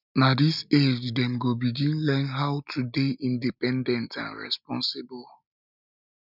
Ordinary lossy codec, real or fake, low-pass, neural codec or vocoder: none; real; 5.4 kHz; none